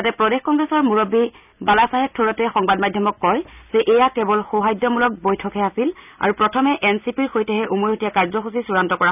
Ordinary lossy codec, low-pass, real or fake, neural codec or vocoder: none; 3.6 kHz; real; none